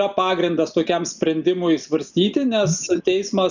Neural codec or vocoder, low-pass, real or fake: none; 7.2 kHz; real